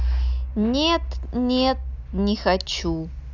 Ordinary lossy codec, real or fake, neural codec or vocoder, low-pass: none; real; none; 7.2 kHz